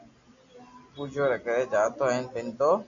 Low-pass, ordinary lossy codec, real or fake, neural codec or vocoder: 7.2 kHz; AAC, 64 kbps; real; none